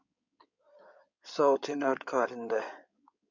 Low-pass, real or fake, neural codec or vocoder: 7.2 kHz; fake; codec, 16 kHz in and 24 kHz out, 2.2 kbps, FireRedTTS-2 codec